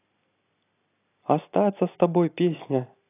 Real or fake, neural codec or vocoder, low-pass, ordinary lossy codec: real; none; 3.6 kHz; AAC, 24 kbps